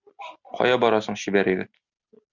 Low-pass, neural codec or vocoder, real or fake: 7.2 kHz; none; real